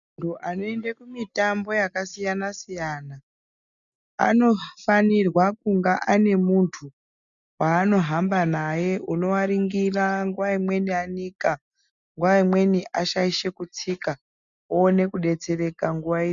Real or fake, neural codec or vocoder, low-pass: real; none; 7.2 kHz